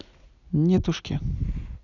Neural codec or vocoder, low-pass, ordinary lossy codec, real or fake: none; 7.2 kHz; none; real